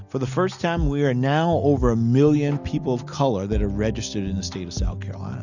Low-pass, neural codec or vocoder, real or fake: 7.2 kHz; none; real